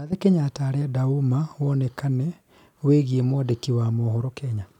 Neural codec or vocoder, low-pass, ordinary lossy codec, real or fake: none; 19.8 kHz; none; real